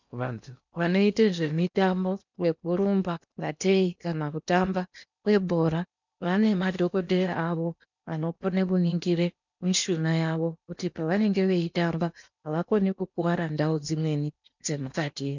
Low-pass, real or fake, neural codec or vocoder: 7.2 kHz; fake; codec, 16 kHz in and 24 kHz out, 0.8 kbps, FocalCodec, streaming, 65536 codes